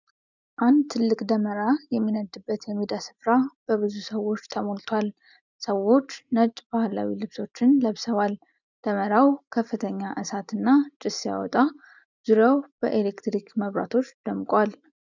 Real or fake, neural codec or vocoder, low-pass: real; none; 7.2 kHz